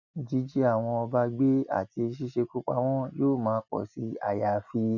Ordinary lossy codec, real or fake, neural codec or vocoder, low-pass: none; real; none; 7.2 kHz